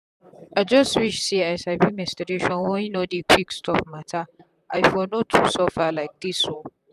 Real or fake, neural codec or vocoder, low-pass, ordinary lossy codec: fake; vocoder, 44.1 kHz, 128 mel bands, Pupu-Vocoder; 14.4 kHz; none